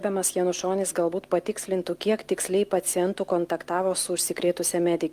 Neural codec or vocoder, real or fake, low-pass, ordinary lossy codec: none; real; 14.4 kHz; Opus, 32 kbps